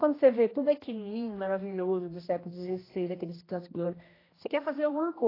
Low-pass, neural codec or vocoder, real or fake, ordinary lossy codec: 5.4 kHz; codec, 16 kHz, 1 kbps, X-Codec, HuBERT features, trained on general audio; fake; AAC, 24 kbps